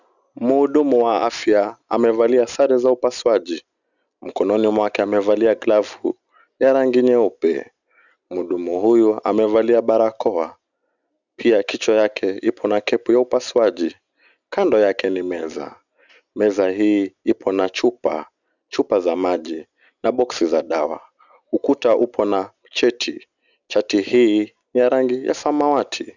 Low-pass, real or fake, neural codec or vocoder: 7.2 kHz; real; none